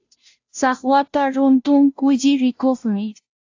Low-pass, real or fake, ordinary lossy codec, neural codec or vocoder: 7.2 kHz; fake; AAC, 48 kbps; codec, 16 kHz, 0.5 kbps, FunCodec, trained on Chinese and English, 25 frames a second